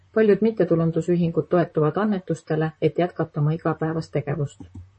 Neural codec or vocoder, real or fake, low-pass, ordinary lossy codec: vocoder, 44.1 kHz, 128 mel bands, Pupu-Vocoder; fake; 10.8 kHz; MP3, 32 kbps